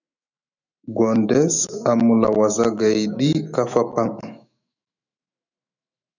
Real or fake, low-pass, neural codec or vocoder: fake; 7.2 kHz; autoencoder, 48 kHz, 128 numbers a frame, DAC-VAE, trained on Japanese speech